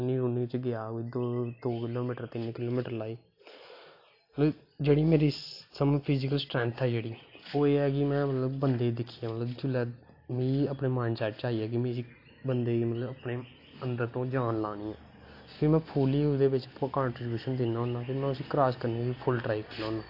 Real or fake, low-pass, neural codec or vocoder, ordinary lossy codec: real; 5.4 kHz; none; AAC, 32 kbps